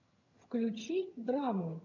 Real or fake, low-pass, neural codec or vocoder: fake; 7.2 kHz; vocoder, 22.05 kHz, 80 mel bands, HiFi-GAN